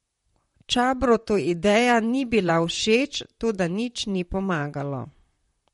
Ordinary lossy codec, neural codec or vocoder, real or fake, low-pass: MP3, 48 kbps; vocoder, 44.1 kHz, 128 mel bands, Pupu-Vocoder; fake; 19.8 kHz